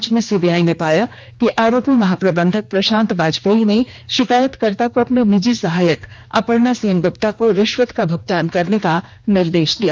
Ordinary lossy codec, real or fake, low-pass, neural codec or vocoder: none; fake; none; codec, 16 kHz, 2 kbps, X-Codec, HuBERT features, trained on general audio